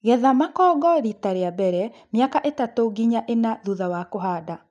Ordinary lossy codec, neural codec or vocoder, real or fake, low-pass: none; none; real; 9.9 kHz